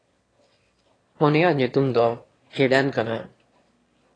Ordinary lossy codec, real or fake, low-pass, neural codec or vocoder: AAC, 32 kbps; fake; 9.9 kHz; autoencoder, 22.05 kHz, a latent of 192 numbers a frame, VITS, trained on one speaker